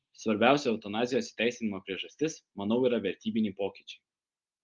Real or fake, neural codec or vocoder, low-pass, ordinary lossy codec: real; none; 7.2 kHz; Opus, 32 kbps